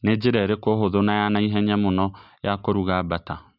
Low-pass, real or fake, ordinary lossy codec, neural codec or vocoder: 5.4 kHz; real; none; none